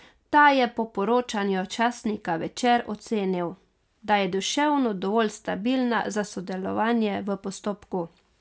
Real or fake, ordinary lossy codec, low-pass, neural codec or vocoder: real; none; none; none